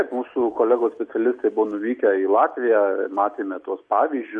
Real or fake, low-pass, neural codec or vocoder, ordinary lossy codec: real; 10.8 kHz; none; MP3, 48 kbps